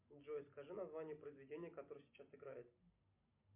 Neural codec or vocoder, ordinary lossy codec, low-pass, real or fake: none; MP3, 32 kbps; 3.6 kHz; real